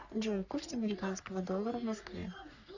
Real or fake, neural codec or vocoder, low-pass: fake; codec, 44.1 kHz, 3.4 kbps, Pupu-Codec; 7.2 kHz